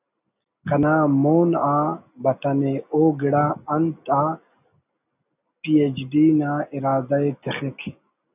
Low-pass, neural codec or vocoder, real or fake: 3.6 kHz; none; real